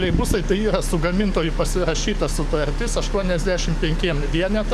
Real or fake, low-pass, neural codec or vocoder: fake; 14.4 kHz; codec, 44.1 kHz, 7.8 kbps, DAC